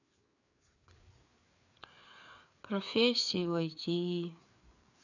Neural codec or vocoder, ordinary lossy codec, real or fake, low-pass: codec, 16 kHz, 4 kbps, FreqCodec, larger model; none; fake; 7.2 kHz